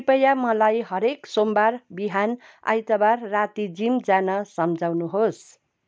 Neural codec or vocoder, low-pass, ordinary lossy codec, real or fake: none; none; none; real